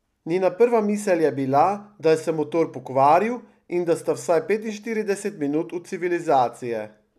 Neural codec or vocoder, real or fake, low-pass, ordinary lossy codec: none; real; 14.4 kHz; none